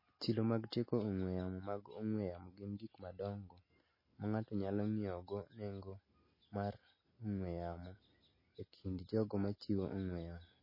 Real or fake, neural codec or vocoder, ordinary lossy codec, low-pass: real; none; MP3, 24 kbps; 5.4 kHz